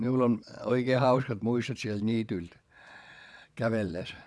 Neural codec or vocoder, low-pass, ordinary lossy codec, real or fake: vocoder, 22.05 kHz, 80 mel bands, WaveNeXt; none; none; fake